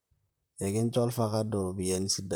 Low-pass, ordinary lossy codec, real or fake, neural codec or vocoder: none; none; fake; vocoder, 44.1 kHz, 128 mel bands, Pupu-Vocoder